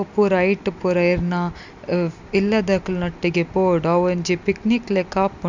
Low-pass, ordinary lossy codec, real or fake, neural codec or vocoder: 7.2 kHz; none; real; none